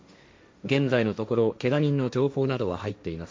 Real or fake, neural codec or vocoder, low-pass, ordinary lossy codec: fake; codec, 16 kHz, 1.1 kbps, Voila-Tokenizer; none; none